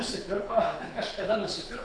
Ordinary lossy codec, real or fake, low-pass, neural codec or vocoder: AAC, 64 kbps; fake; 9.9 kHz; codec, 24 kHz, 6 kbps, HILCodec